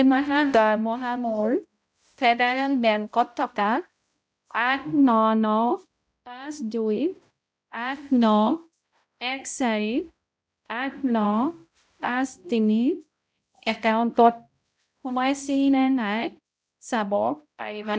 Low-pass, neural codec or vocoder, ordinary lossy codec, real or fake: none; codec, 16 kHz, 0.5 kbps, X-Codec, HuBERT features, trained on balanced general audio; none; fake